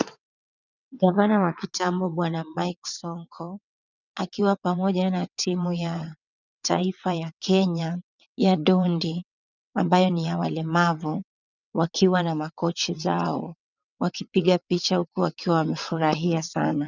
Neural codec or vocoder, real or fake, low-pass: vocoder, 22.05 kHz, 80 mel bands, WaveNeXt; fake; 7.2 kHz